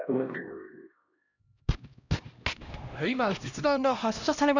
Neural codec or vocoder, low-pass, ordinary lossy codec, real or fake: codec, 16 kHz, 1 kbps, X-Codec, HuBERT features, trained on LibriSpeech; 7.2 kHz; none; fake